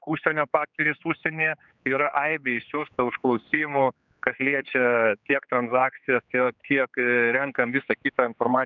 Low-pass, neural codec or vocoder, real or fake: 7.2 kHz; codec, 16 kHz, 4 kbps, X-Codec, HuBERT features, trained on general audio; fake